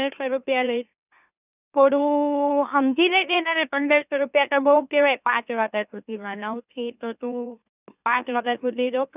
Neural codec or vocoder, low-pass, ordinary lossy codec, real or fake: autoencoder, 44.1 kHz, a latent of 192 numbers a frame, MeloTTS; 3.6 kHz; none; fake